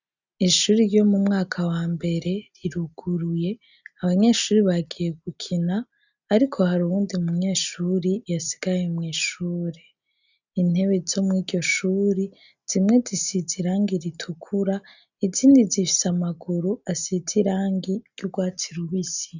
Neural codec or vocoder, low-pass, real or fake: none; 7.2 kHz; real